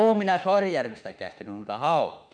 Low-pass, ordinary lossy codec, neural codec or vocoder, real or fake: 9.9 kHz; none; autoencoder, 48 kHz, 32 numbers a frame, DAC-VAE, trained on Japanese speech; fake